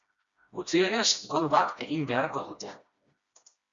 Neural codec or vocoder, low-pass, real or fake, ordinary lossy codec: codec, 16 kHz, 1 kbps, FreqCodec, smaller model; 7.2 kHz; fake; Opus, 64 kbps